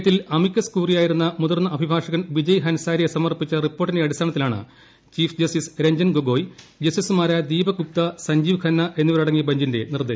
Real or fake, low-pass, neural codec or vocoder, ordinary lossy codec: real; none; none; none